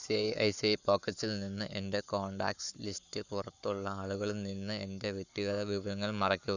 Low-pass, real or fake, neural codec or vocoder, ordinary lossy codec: 7.2 kHz; fake; codec, 44.1 kHz, 7.8 kbps, Pupu-Codec; none